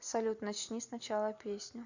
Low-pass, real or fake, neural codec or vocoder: 7.2 kHz; real; none